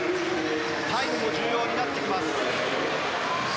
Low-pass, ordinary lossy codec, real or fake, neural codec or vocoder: none; none; real; none